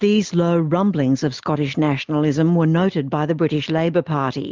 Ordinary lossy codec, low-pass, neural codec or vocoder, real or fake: Opus, 32 kbps; 7.2 kHz; none; real